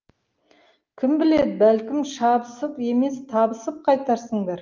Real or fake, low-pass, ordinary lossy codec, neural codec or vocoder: real; 7.2 kHz; Opus, 24 kbps; none